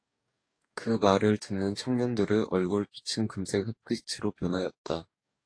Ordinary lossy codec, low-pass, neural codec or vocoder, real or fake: AAC, 48 kbps; 9.9 kHz; codec, 44.1 kHz, 2.6 kbps, DAC; fake